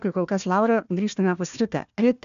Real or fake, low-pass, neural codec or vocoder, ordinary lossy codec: fake; 7.2 kHz; codec, 16 kHz, 1 kbps, FunCodec, trained on Chinese and English, 50 frames a second; AAC, 96 kbps